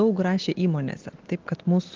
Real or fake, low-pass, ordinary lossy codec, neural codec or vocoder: real; 7.2 kHz; Opus, 16 kbps; none